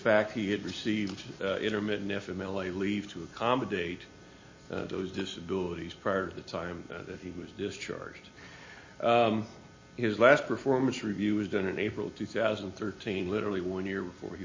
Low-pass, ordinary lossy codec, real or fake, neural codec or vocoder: 7.2 kHz; MP3, 32 kbps; real; none